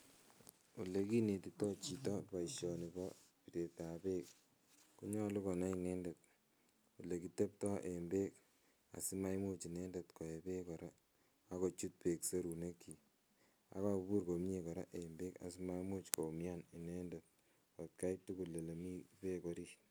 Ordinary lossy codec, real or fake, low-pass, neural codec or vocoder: none; real; none; none